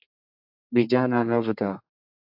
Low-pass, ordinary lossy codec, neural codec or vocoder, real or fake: 5.4 kHz; AAC, 48 kbps; codec, 32 kHz, 1.9 kbps, SNAC; fake